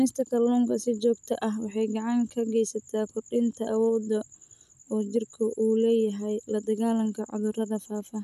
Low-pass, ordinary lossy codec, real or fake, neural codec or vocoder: 14.4 kHz; none; real; none